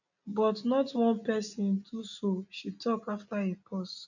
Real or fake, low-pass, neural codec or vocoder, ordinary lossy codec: real; 7.2 kHz; none; none